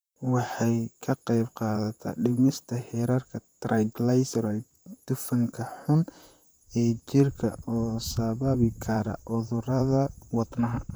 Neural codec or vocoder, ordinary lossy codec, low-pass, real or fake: vocoder, 44.1 kHz, 128 mel bands, Pupu-Vocoder; none; none; fake